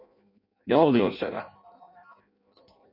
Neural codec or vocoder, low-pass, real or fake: codec, 16 kHz in and 24 kHz out, 0.6 kbps, FireRedTTS-2 codec; 5.4 kHz; fake